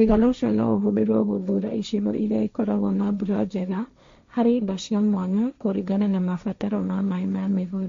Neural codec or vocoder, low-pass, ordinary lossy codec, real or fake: codec, 16 kHz, 1.1 kbps, Voila-Tokenizer; 7.2 kHz; MP3, 48 kbps; fake